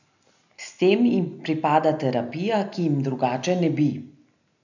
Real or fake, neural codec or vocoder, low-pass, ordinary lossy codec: real; none; 7.2 kHz; none